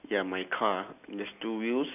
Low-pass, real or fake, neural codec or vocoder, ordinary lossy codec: 3.6 kHz; real; none; none